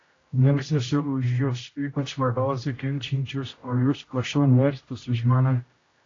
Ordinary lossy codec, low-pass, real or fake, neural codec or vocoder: AAC, 32 kbps; 7.2 kHz; fake; codec, 16 kHz, 0.5 kbps, X-Codec, HuBERT features, trained on general audio